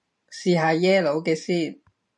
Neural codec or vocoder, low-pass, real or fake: vocoder, 44.1 kHz, 128 mel bands every 512 samples, BigVGAN v2; 10.8 kHz; fake